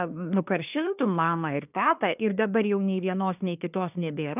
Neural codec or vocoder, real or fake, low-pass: codec, 24 kHz, 1 kbps, SNAC; fake; 3.6 kHz